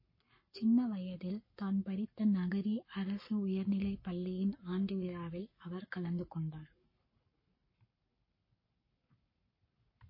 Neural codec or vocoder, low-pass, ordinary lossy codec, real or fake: codec, 44.1 kHz, 7.8 kbps, Pupu-Codec; 5.4 kHz; MP3, 24 kbps; fake